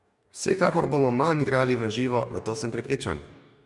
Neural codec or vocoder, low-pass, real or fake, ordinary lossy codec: codec, 44.1 kHz, 2.6 kbps, DAC; 10.8 kHz; fake; MP3, 96 kbps